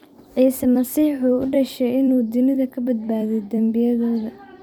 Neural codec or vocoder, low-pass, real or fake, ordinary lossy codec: vocoder, 44.1 kHz, 128 mel bands every 256 samples, BigVGAN v2; 19.8 kHz; fake; MP3, 96 kbps